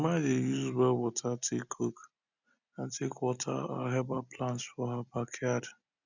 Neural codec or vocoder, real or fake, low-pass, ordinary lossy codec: none; real; 7.2 kHz; none